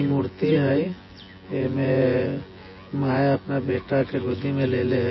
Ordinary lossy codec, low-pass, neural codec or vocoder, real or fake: MP3, 24 kbps; 7.2 kHz; vocoder, 24 kHz, 100 mel bands, Vocos; fake